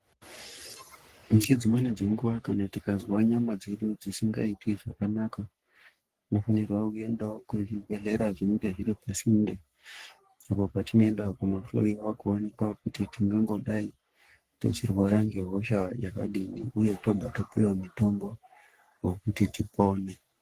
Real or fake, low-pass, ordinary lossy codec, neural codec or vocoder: fake; 14.4 kHz; Opus, 24 kbps; codec, 44.1 kHz, 3.4 kbps, Pupu-Codec